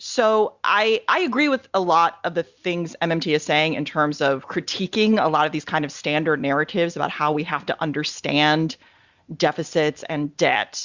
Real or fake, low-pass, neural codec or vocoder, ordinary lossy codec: real; 7.2 kHz; none; Opus, 64 kbps